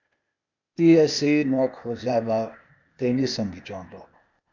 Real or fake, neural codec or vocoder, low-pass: fake; codec, 16 kHz, 0.8 kbps, ZipCodec; 7.2 kHz